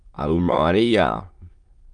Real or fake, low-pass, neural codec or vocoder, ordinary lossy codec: fake; 9.9 kHz; autoencoder, 22.05 kHz, a latent of 192 numbers a frame, VITS, trained on many speakers; Opus, 32 kbps